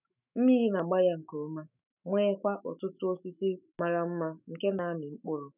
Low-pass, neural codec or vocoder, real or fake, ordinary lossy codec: 3.6 kHz; autoencoder, 48 kHz, 128 numbers a frame, DAC-VAE, trained on Japanese speech; fake; none